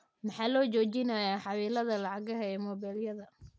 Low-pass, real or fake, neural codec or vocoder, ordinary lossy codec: none; real; none; none